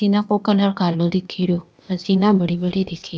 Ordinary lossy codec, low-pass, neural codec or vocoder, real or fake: none; none; codec, 16 kHz, 0.8 kbps, ZipCodec; fake